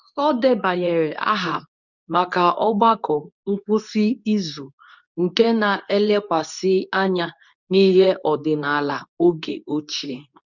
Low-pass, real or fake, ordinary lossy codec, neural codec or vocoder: 7.2 kHz; fake; none; codec, 24 kHz, 0.9 kbps, WavTokenizer, medium speech release version 2